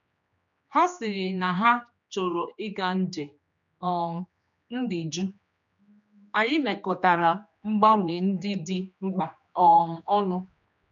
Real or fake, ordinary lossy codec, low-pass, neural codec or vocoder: fake; none; 7.2 kHz; codec, 16 kHz, 2 kbps, X-Codec, HuBERT features, trained on general audio